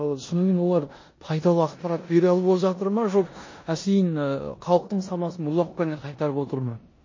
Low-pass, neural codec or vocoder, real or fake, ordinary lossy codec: 7.2 kHz; codec, 16 kHz in and 24 kHz out, 0.9 kbps, LongCat-Audio-Codec, four codebook decoder; fake; MP3, 32 kbps